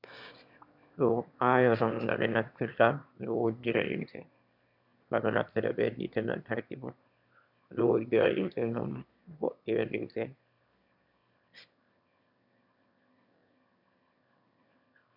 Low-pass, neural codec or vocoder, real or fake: 5.4 kHz; autoencoder, 22.05 kHz, a latent of 192 numbers a frame, VITS, trained on one speaker; fake